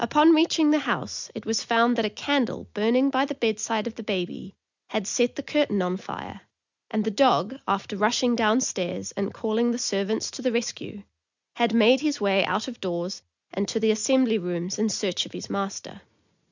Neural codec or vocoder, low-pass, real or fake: none; 7.2 kHz; real